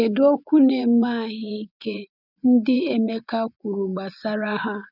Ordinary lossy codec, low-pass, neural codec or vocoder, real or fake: none; 5.4 kHz; vocoder, 24 kHz, 100 mel bands, Vocos; fake